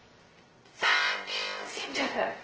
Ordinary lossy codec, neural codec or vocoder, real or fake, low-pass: Opus, 16 kbps; codec, 16 kHz, 0.2 kbps, FocalCodec; fake; 7.2 kHz